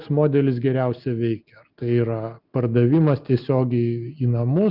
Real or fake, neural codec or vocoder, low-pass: real; none; 5.4 kHz